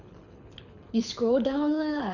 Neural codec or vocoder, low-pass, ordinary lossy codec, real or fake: codec, 24 kHz, 6 kbps, HILCodec; 7.2 kHz; none; fake